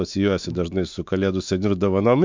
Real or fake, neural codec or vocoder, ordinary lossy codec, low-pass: fake; autoencoder, 48 kHz, 128 numbers a frame, DAC-VAE, trained on Japanese speech; MP3, 64 kbps; 7.2 kHz